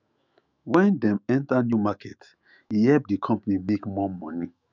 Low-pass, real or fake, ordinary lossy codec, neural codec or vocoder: 7.2 kHz; fake; none; autoencoder, 48 kHz, 128 numbers a frame, DAC-VAE, trained on Japanese speech